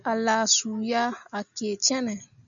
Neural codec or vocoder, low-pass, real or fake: none; 7.2 kHz; real